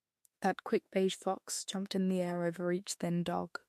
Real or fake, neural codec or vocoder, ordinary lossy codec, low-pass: fake; autoencoder, 48 kHz, 32 numbers a frame, DAC-VAE, trained on Japanese speech; MP3, 64 kbps; 14.4 kHz